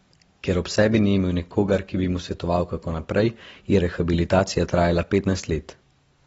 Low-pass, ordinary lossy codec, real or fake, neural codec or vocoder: 10.8 kHz; AAC, 24 kbps; real; none